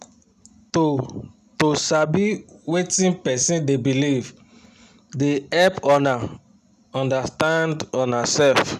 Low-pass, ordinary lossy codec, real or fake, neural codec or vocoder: 14.4 kHz; none; real; none